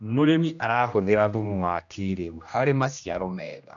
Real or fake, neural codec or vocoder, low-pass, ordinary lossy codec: fake; codec, 16 kHz, 1 kbps, X-Codec, HuBERT features, trained on general audio; 7.2 kHz; none